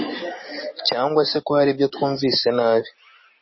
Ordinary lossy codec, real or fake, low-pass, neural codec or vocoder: MP3, 24 kbps; real; 7.2 kHz; none